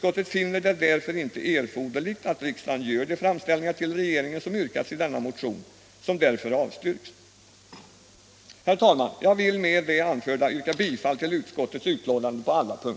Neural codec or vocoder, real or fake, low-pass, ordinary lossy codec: none; real; none; none